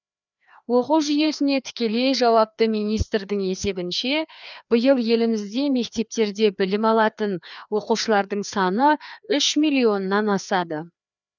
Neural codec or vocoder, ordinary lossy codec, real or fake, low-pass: codec, 16 kHz, 2 kbps, FreqCodec, larger model; none; fake; 7.2 kHz